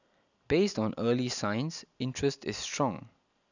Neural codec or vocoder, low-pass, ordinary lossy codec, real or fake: none; 7.2 kHz; none; real